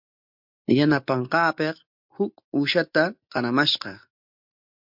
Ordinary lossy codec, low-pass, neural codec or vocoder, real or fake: MP3, 48 kbps; 5.4 kHz; none; real